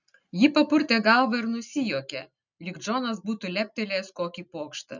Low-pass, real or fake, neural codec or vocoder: 7.2 kHz; real; none